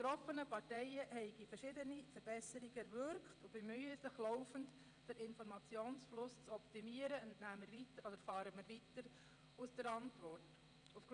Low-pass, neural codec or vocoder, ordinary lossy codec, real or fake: 9.9 kHz; vocoder, 22.05 kHz, 80 mel bands, WaveNeXt; none; fake